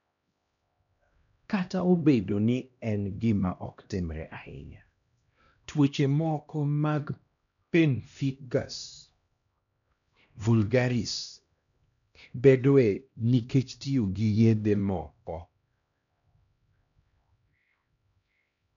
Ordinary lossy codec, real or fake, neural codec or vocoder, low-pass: none; fake; codec, 16 kHz, 1 kbps, X-Codec, HuBERT features, trained on LibriSpeech; 7.2 kHz